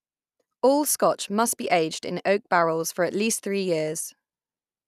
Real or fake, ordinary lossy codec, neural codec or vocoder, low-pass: real; none; none; 14.4 kHz